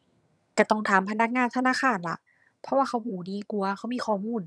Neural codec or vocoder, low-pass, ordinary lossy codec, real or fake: vocoder, 22.05 kHz, 80 mel bands, HiFi-GAN; none; none; fake